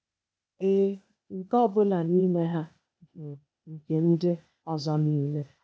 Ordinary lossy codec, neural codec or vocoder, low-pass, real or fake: none; codec, 16 kHz, 0.8 kbps, ZipCodec; none; fake